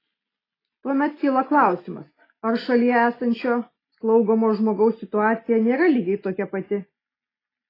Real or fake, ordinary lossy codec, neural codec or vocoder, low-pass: real; AAC, 24 kbps; none; 5.4 kHz